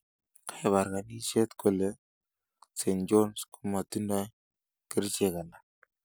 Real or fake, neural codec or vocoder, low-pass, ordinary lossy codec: real; none; none; none